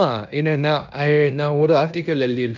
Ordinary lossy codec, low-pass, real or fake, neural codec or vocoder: none; 7.2 kHz; fake; codec, 16 kHz in and 24 kHz out, 0.9 kbps, LongCat-Audio-Codec, fine tuned four codebook decoder